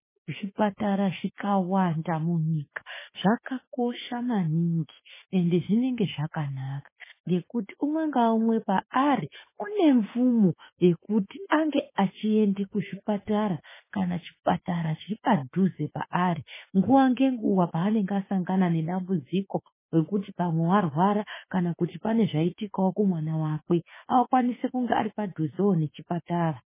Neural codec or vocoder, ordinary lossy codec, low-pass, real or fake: autoencoder, 48 kHz, 32 numbers a frame, DAC-VAE, trained on Japanese speech; MP3, 16 kbps; 3.6 kHz; fake